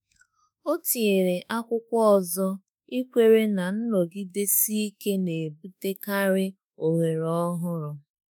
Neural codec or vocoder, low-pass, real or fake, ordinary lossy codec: autoencoder, 48 kHz, 32 numbers a frame, DAC-VAE, trained on Japanese speech; none; fake; none